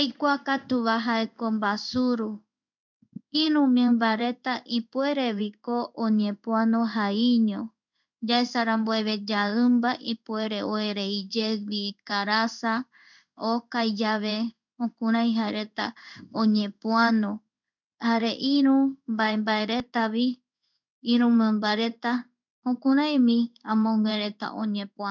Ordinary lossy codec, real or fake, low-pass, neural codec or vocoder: none; fake; 7.2 kHz; codec, 16 kHz in and 24 kHz out, 1 kbps, XY-Tokenizer